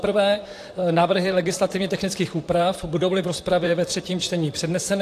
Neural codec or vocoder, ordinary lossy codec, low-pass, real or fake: vocoder, 44.1 kHz, 128 mel bands, Pupu-Vocoder; AAC, 64 kbps; 14.4 kHz; fake